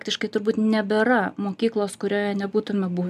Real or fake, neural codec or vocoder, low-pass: real; none; 14.4 kHz